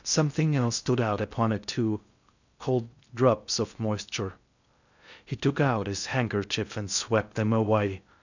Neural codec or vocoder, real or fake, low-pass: codec, 16 kHz in and 24 kHz out, 0.6 kbps, FocalCodec, streaming, 2048 codes; fake; 7.2 kHz